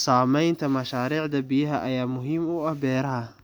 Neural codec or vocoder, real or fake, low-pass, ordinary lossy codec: none; real; none; none